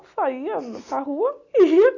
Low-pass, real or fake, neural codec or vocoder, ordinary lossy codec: 7.2 kHz; real; none; none